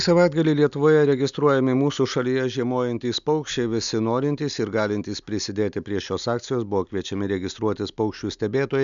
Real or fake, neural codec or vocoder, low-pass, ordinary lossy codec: real; none; 7.2 kHz; MP3, 96 kbps